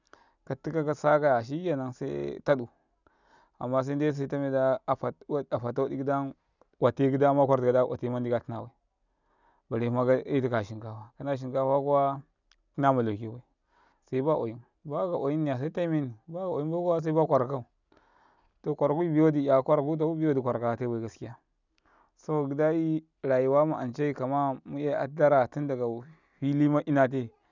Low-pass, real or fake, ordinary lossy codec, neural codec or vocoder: 7.2 kHz; real; none; none